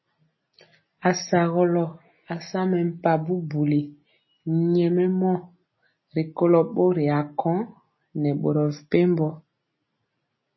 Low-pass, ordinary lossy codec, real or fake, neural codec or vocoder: 7.2 kHz; MP3, 24 kbps; real; none